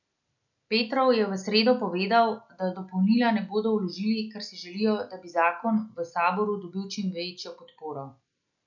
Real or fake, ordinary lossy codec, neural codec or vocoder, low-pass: real; none; none; 7.2 kHz